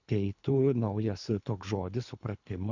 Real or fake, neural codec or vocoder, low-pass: fake; codec, 24 kHz, 3 kbps, HILCodec; 7.2 kHz